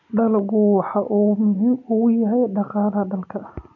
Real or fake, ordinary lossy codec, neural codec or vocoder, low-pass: real; none; none; 7.2 kHz